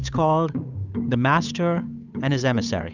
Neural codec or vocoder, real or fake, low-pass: codec, 16 kHz, 8 kbps, FunCodec, trained on Chinese and English, 25 frames a second; fake; 7.2 kHz